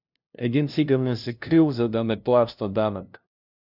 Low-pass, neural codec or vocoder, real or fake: 5.4 kHz; codec, 16 kHz, 0.5 kbps, FunCodec, trained on LibriTTS, 25 frames a second; fake